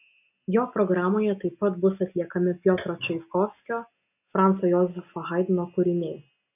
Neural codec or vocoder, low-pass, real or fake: none; 3.6 kHz; real